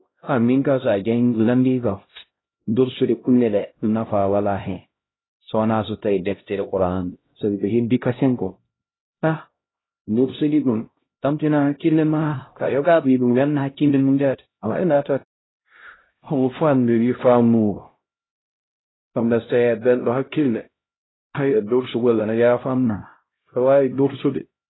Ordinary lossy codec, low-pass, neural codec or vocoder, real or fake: AAC, 16 kbps; 7.2 kHz; codec, 16 kHz, 0.5 kbps, X-Codec, HuBERT features, trained on LibriSpeech; fake